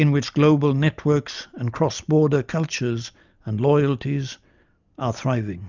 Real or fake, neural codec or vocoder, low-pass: real; none; 7.2 kHz